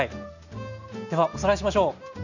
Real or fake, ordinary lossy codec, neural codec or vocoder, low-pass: real; none; none; 7.2 kHz